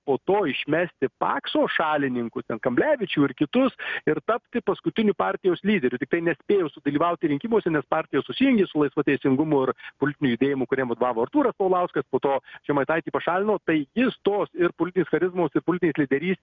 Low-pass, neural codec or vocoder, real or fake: 7.2 kHz; none; real